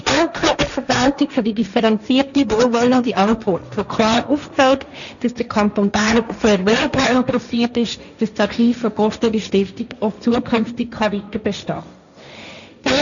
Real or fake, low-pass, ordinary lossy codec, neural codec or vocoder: fake; 7.2 kHz; none; codec, 16 kHz, 1.1 kbps, Voila-Tokenizer